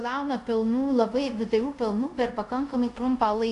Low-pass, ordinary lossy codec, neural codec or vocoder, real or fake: 10.8 kHz; AAC, 64 kbps; codec, 24 kHz, 0.5 kbps, DualCodec; fake